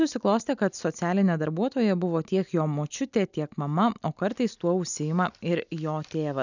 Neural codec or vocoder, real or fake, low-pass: none; real; 7.2 kHz